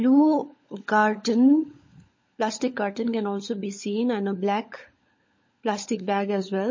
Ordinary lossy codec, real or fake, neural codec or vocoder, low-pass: MP3, 32 kbps; fake; codec, 16 kHz, 16 kbps, FunCodec, trained on LibriTTS, 50 frames a second; 7.2 kHz